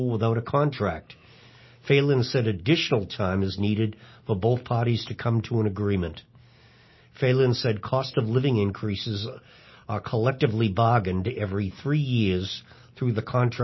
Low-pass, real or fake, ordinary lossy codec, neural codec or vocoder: 7.2 kHz; real; MP3, 24 kbps; none